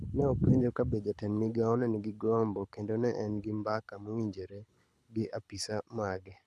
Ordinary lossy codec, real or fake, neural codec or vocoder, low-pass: none; fake; codec, 24 kHz, 6 kbps, HILCodec; none